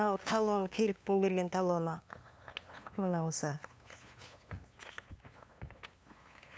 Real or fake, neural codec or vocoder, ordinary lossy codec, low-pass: fake; codec, 16 kHz, 2 kbps, FunCodec, trained on LibriTTS, 25 frames a second; none; none